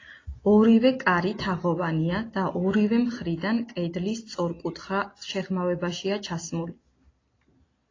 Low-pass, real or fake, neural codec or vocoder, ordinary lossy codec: 7.2 kHz; real; none; AAC, 32 kbps